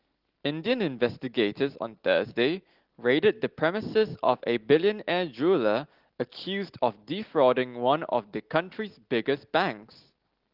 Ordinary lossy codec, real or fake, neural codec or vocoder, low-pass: Opus, 16 kbps; real; none; 5.4 kHz